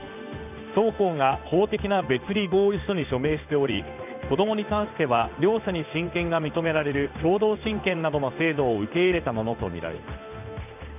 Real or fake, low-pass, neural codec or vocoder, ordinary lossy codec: fake; 3.6 kHz; codec, 16 kHz in and 24 kHz out, 1 kbps, XY-Tokenizer; none